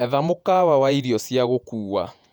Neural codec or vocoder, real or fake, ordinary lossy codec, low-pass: vocoder, 48 kHz, 128 mel bands, Vocos; fake; none; 19.8 kHz